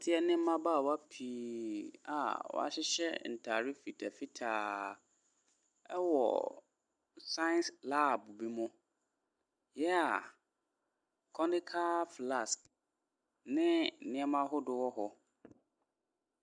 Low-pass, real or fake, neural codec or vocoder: 9.9 kHz; real; none